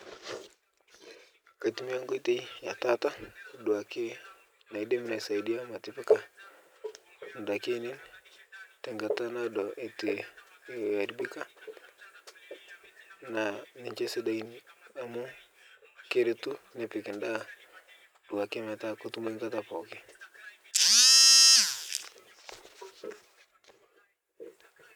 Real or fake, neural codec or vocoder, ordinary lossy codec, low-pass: real; none; none; none